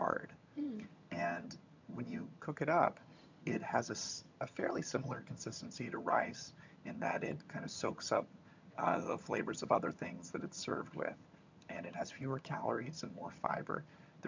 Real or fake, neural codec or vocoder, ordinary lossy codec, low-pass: fake; vocoder, 22.05 kHz, 80 mel bands, HiFi-GAN; MP3, 64 kbps; 7.2 kHz